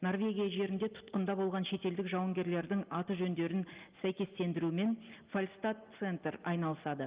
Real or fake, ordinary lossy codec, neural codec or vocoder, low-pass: real; Opus, 32 kbps; none; 3.6 kHz